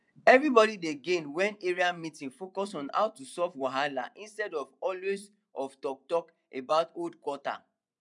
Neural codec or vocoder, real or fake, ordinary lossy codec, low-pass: vocoder, 24 kHz, 100 mel bands, Vocos; fake; none; 10.8 kHz